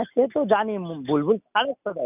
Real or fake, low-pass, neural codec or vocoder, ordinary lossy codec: real; 3.6 kHz; none; none